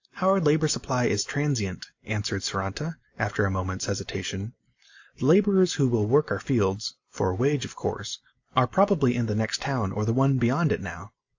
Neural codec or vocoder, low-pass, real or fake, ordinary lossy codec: none; 7.2 kHz; real; AAC, 48 kbps